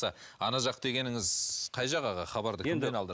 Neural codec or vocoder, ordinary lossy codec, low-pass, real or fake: none; none; none; real